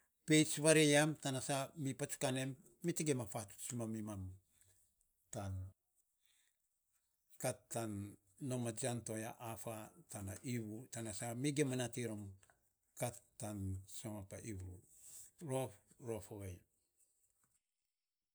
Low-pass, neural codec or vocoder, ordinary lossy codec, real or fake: none; none; none; real